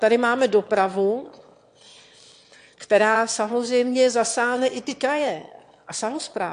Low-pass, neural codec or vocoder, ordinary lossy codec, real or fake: 9.9 kHz; autoencoder, 22.05 kHz, a latent of 192 numbers a frame, VITS, trained on one speaker; AAC, 64 kbps; fake